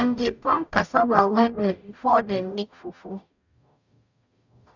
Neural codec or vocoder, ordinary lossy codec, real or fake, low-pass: codec, 44.1 kHz, 0.9 kbps, DAC; none; fake; 7.2 kHz